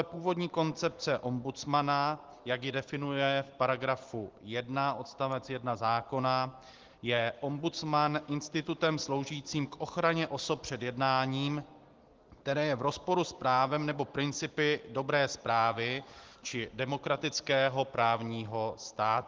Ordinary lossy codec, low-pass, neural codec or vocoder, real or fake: Opus, 16 kbps; 7.2 kHz; none; real